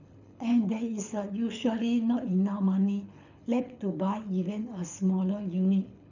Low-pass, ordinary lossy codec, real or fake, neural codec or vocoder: 7.2 kHz; none; fake; codec, 24 kHz, 6 kbps, HILCodec